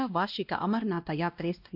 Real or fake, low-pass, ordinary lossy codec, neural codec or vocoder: fake; 5.4 kHz; AAC, 48 kbps; codec, 16 kHz, 1 kbps, X-Codec, WavLM features, trained on Multilingual LibriSpeech